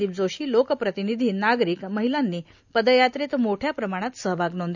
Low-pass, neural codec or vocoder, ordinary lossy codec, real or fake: 7.2 kHz; none; none; real